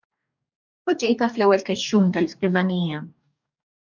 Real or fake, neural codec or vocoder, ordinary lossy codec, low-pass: fake; codec, 44.1 kHz, 2.6 kbps, DAC; MP3, 64 kbps; 7.2 kHz